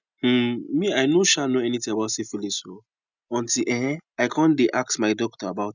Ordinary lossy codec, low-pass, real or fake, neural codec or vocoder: none; 7.2 kHz; real; none